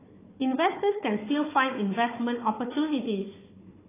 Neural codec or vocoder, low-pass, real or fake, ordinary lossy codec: codec, 16 kHz, 4 kbps, FunCodec, trained on Chinese and English, 50 frames a second; 3.6 kHz; fake; AAC, 16 kbps